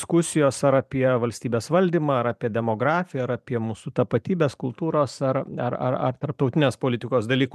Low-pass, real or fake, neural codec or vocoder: 14.4 kHz; fake; vocoder, 44.1 kHz, 128 mel bands every 512 samples, BigVGAN v2